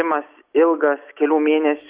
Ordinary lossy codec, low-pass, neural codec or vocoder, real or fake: Opus, 64 kbps; 3.6 kHz; none; real